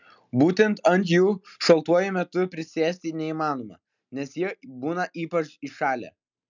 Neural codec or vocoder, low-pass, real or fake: none; 7.2 kHz; real